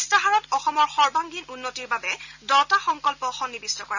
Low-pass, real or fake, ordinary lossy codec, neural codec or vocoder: 7.2 kHz; real; none; none